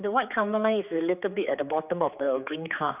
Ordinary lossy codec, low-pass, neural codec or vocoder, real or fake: none; 3.6 kHz; codec, 16 kHz, 4 kbps, X-Codec, HuBERT features, trained on general audio; fake